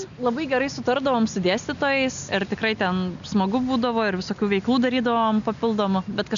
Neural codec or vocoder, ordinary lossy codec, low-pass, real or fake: none; MP3, 96 kbps; 7.2 kHz; real